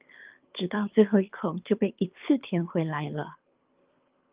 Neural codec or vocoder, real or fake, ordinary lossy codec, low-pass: codec, 24 kHz, 6 kbps, HILCodec; fake; Opus, 32 kbps; 3.6 kHz